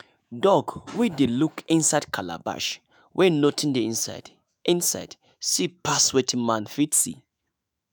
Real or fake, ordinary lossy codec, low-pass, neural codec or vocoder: fake; none; none; autoencoder, 48 kHz, 128 numbers a frame, DAC-VAE, trained on Japanese speech